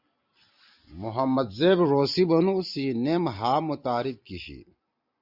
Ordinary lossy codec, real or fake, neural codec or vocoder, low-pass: Opus, 64 kbps; fake; vocoder, 44.1 kHz, 128 mel bands every 256 samples, BigVGAN v2; 5.4 kHz